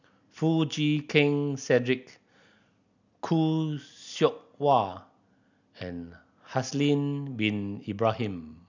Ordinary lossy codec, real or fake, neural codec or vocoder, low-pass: none; real; none; 7.2 kHz